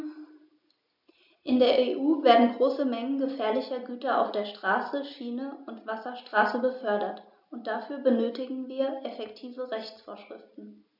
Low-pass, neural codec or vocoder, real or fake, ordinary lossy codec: 5.4 kHz; none; real; none